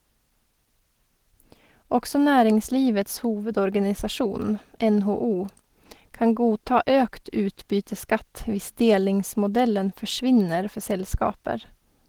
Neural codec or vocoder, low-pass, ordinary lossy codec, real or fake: none; 19.8 kHz; Opus, 16 kbps; real